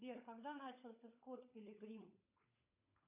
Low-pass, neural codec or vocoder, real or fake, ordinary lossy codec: 3.6 kHz; codec, 16 kHz, 16 kbps, FunCodec, trained on LibriTTS, 50 frames a second; fake; AAC, 24 kbps